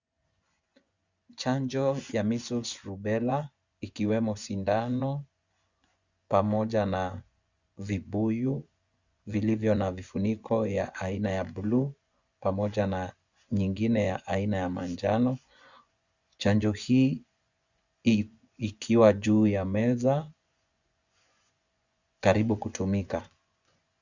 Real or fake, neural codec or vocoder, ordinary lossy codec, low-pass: fake; vocoder, 24 kHz, 100 mel bands, Vocos; Opus, 64 kbps; 7.2 kHz